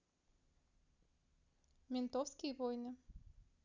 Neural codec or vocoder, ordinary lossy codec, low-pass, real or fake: none; none; 7.2 kHz; real